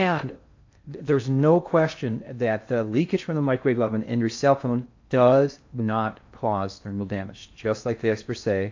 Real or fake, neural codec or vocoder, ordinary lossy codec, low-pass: fake; codec, 16 kHz in and 24 kHz out, 0.6 kbps, FocalCodec, streaming, 2048 codes; AAC, 48 kbps; 7.2 kHz